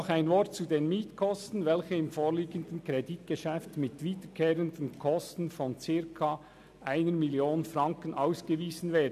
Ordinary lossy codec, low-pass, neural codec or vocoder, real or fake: none; 14.4 kHz; none; real